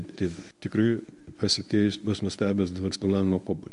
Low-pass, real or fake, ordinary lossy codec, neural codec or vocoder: 10.8 kHz; fake; MP3, 64 kbps; codec, 24 kHz, 0.9 kbps, WavTokenizer, medium speech release version 1